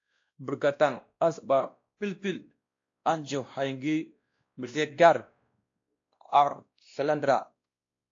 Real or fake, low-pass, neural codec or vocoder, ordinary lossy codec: fake; 7.2 kHz; codec, 16 kHz, 1 kbps, X-Codec, WavLM features, trained on Multilingual LibriSpeech; AAC, 48 kbps